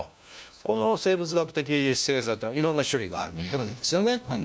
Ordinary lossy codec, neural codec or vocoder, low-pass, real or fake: none; codec, 16 kHz, 1 kbps, FunCodec, trained on LibriTTS, 50 frames a second; none; fake